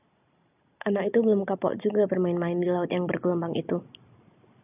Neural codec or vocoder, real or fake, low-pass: none; real; 3.6 kHz